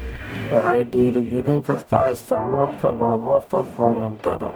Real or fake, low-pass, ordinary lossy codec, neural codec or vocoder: fake; none; none; codec, 44.1 kHz, 0.9 kbps, DAC